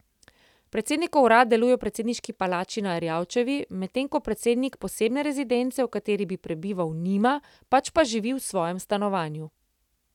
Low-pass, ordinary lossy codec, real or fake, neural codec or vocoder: 19.8 kHz; none; real; none